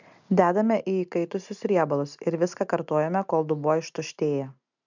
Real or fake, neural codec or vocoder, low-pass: real; none; 7.2 kHz